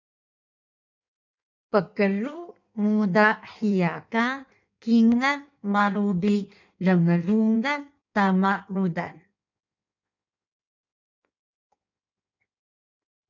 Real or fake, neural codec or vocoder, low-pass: fake; codec, 16 kHz in and 24 kHz out, 1.1 kbps, FireRedTTS-2 codec; 7.2 kHz